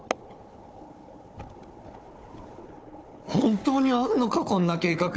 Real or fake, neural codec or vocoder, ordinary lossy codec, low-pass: fake; codec, 16 kHz, 4 kbps, FunCodec, trained on Chinese and English, 50 frames a second; none; none